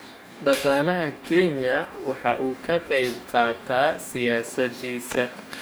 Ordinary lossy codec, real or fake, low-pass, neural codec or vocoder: none; fake; none; codec, 44.1 kHz, 2.6 kbps, DAC